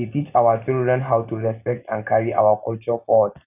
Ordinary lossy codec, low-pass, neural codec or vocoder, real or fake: none; 3.6 kHz; none; real